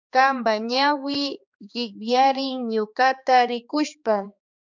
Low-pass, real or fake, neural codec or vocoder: 7.2 kHz; fake; codec, 16 kHz, 2 kbps, X-Codec, HuBERT features, trained on balanced general audio